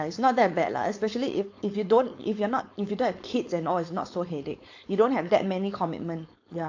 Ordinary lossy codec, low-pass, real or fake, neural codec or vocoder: AAC, 48 kbps; 7.2 kHz; fake; codec, 16 kHz, 4.8 kbps, FACodec